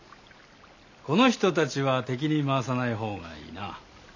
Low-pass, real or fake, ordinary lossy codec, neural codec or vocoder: 7.2 kHz; real; none; none